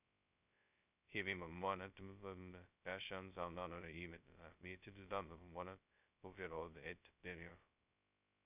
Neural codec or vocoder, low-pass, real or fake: codec, 16 kHz, 0.2 kbps, FocalCodec; 3.6 kHz; fake